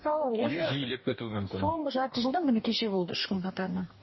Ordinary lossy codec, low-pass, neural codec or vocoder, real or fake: MP3, 24 kbps; 7.2 kHz; codec, 44.1 kHz, 2.6 kbps, DAC; fake